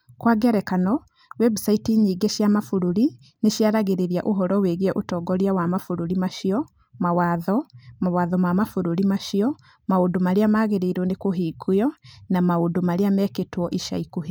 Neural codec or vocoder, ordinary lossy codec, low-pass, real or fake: none; none; none; real